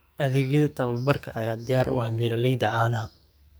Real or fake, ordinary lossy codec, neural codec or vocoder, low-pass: fake; none; codec, 44.1 kHz, 2.6 kbps, SNAC; none